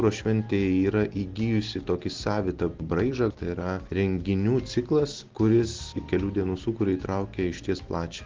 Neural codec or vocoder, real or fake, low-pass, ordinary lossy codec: none; real; 7.2 kHz; Opus, 16 kbps